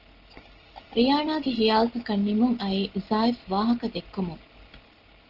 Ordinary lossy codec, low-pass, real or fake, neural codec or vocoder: Opus, 16 kbps; 5.4 kHz; real; none